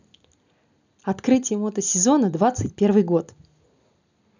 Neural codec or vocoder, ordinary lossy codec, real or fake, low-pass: none; none; real; 7.2 kHz